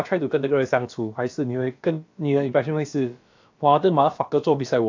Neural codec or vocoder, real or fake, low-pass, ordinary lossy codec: codec, 16 kHz, about 1 kbps, DyCAST, with the encoder's durations; fake; 7.2 kHz; AAC, 48 kbps